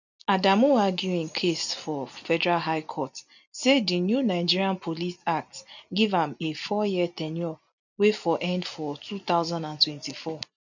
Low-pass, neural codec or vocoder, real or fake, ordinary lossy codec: 7.2 kHz; none; real; none